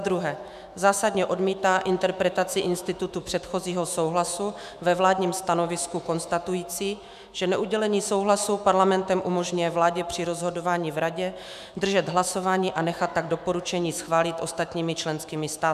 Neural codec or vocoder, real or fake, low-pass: autoencoder, 48 kHz, 128 numbers a frame, DAC-VAE, trained on Japanese speech; fake; 14.4 kHz